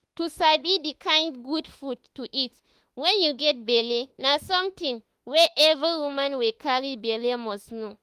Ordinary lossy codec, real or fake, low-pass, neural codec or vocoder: Opus, 24 kbps; fake; 14.4 kHz; autoencoder, 48 kHz, 32 numbers a frame, DAC-VAE, trained on Japanese speech